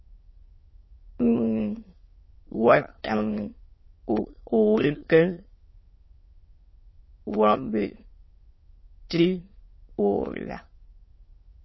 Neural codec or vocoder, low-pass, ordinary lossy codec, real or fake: autoencoder, 22.05 kHz, a latent of 192 numbers a frame, VITS, trained on many speakers; 7.2 kHz; MP3, 24 kbps; fake